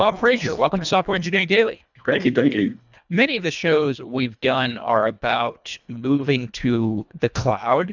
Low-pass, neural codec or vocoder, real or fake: 7.2 kHz; codec, 24 kHz, 1.5 kbps, HILCodec; fake